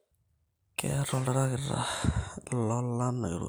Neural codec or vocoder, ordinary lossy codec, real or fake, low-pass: none; none; real; none